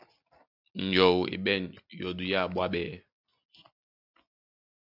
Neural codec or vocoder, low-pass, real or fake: none; 5.4 kHz; real